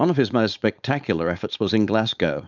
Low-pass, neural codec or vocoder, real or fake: 7.2 kHz; codec, 16 kHz, 4.8 kbps, FACodec; fake